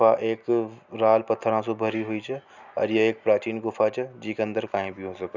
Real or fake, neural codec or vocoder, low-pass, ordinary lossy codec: real; none; 7.2 kHz; none